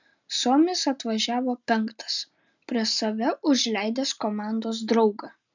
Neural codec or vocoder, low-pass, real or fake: none; 7.2 kHz; real